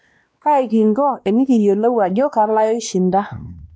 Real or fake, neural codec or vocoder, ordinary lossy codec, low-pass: fake; codec, 16 kHz, 2 kbps, X-Codec, WavLM features, trained on Multilingual LibriSpeech; none; none